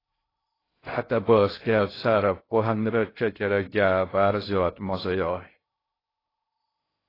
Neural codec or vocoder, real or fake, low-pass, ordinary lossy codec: codec, 16 kHz in and 24 kHz out, 0.6 kbps, FocalCodec, streaming, 2048 codes; fake; 5.4 kHz; AAC, 24 kbps